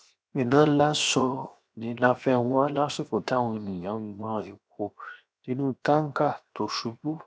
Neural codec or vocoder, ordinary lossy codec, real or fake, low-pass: codec, 16 kHz, 0.7 kbps, FocalCodec; none; fake; none